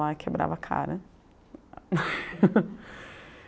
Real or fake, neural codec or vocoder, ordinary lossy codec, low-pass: real; none; none; none